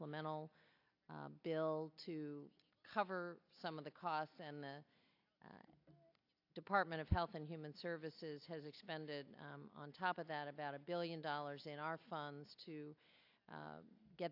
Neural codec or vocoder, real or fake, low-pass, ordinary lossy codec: none; real; 5.4 kHz; AAC, 48 kbps